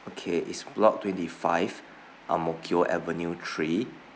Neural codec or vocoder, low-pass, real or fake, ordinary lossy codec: none; none; real; none